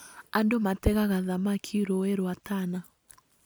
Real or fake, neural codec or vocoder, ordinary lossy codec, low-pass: real; none; none; none